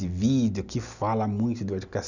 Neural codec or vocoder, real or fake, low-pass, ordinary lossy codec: none; real; 7.2 kHz; none